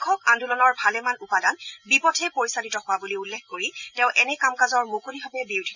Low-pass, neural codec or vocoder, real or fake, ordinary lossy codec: 7.2 kHz; none; real; none